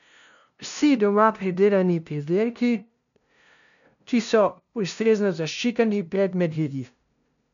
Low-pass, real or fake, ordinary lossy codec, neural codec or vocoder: 7.2 kHz; fake; none; codec, 16 kHz, 0.5 kbps, FunCodec, trained on LibriTTS, 25 frames a second